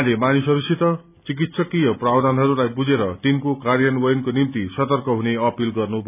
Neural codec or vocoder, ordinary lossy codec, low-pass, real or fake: none; none; 3.6 kHz; real